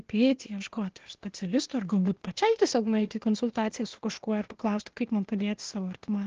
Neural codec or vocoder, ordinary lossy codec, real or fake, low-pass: codec, 16 kHz, 0.8 kbps, ZipCodec; Opus, 16 kbps; fake; 7.2 kHz